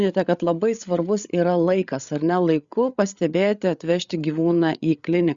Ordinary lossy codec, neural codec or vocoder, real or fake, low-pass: Opus, 64 kbps; codec, 16 kHz, 8 kbps, FreqCodec, larger model; fake; 7.2 kHz